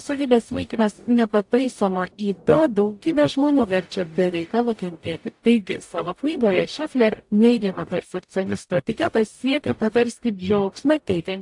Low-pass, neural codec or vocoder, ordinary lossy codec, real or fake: 10.8 kHz; codec, 44.1 kHz, 0.9 kbps, DAC; AAC, 64 kbps; fake